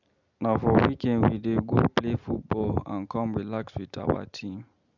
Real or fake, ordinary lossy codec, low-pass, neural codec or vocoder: real; none; 7.2 kHz; none